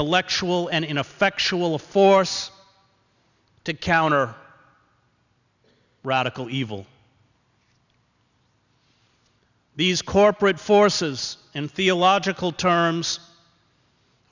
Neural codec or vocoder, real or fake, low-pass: none; real; 7.2 kHz